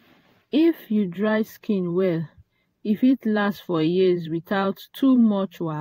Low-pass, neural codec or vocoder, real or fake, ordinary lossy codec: 19.8 kHz; vocoder, 44.1 kHz, 128 mel bands every 512 samples, BigVGAN v2; fake; AAC, 48 kbps